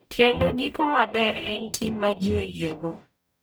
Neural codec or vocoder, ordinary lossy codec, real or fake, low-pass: codec, 44.1 kHz, 0.9 kbps, DAC; none; fake; none